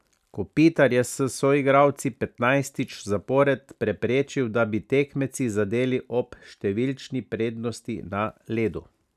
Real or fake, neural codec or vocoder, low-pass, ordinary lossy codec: real; none; 14.4 kHz; none